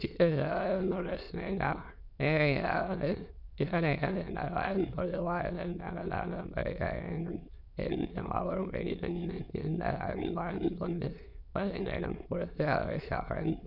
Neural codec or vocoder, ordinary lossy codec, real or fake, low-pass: autoencoder, 22.05 kHz, a latent of 192 numbers a frame, VITS, trained on many speakers; none; fake; 5.4 kHz